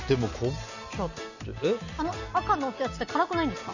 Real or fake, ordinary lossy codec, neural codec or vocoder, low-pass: real; none; none; 7.2 kHz